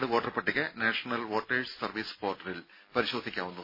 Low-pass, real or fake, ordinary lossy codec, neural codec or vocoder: 5.4 kHz; real; MP3, 24 kbps; none